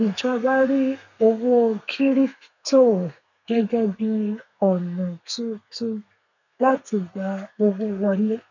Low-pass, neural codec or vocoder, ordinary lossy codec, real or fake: 7.2 kHz; codec, 32 kHz, 1.9 kbps, SNAC; none; fake